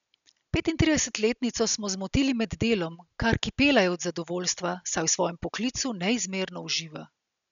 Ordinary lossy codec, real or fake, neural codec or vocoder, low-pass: none; real; none; 7.2 kHz